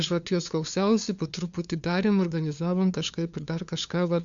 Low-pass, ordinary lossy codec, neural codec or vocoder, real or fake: 7.2 kHz; Opus, 64 kbps; codec, 16 kHz, 2 kbps, FunCodec, trained on LibriTTS, 25 frames a second; fake